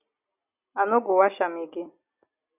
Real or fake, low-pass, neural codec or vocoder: real; 3.6 kHz; none